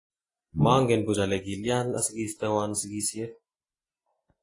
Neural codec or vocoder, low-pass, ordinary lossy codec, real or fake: none; 10.8 kHz; AAC, 32 kbps; real